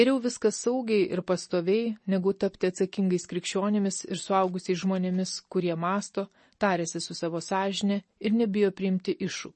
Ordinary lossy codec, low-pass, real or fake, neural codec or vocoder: MP3, 32 kbps; 10.8 kHz; real; none